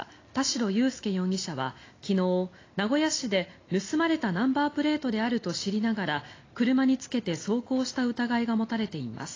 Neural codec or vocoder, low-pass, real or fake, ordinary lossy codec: none; 7.2 kHz; real; AAC, 32 kbps